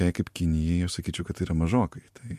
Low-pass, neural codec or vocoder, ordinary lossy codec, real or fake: 14.4 kHz; none; MP3, 64 kbps; real